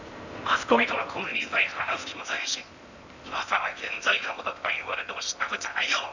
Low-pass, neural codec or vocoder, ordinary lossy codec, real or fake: 7.2 kHz; codec, 16 kHz in and 24 kHz out, 0.8 kbps, FocalCodec, streaming, 65536 codes; none; fake